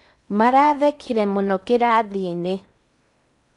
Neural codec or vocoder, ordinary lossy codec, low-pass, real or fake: codec, 16 kHz in and 24 kHz out, 0.8 kbps, FocalCodec, streaming, 65536 codes; none; 10.8 kHz; fake